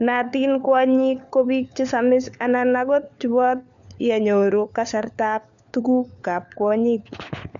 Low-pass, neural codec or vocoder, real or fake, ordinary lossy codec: 7.2 kHz; codec, 16 kHz, 4 kbps, FunCodec, trained on LibriTTS, 50 frames a second; fake; none